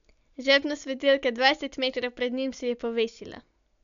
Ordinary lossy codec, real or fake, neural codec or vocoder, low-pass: none; real; none; 7.2 kHz